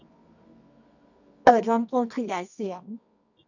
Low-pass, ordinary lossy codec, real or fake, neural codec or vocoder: 7.2 kHz; none; fake; codec, 24 kHz, 0.9 kbps, WavTokenizer, medium music audio release